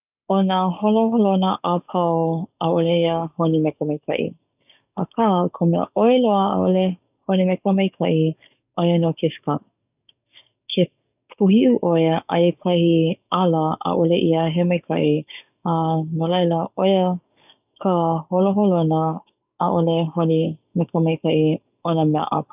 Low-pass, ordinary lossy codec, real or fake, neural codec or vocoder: 3.6 kHz; none; fake; codec, 44.1 kHz, 7.8 kbps, DAC